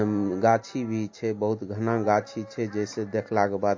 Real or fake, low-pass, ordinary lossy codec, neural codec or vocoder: real; 7.2 kHz; MP3, 32 kbps; none